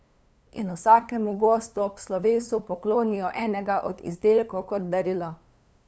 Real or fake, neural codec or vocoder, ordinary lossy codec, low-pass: fake; codec, 16 kHz, 2 kbps, FunCodec, trained on LibriTTS, 25 frames a second; none; none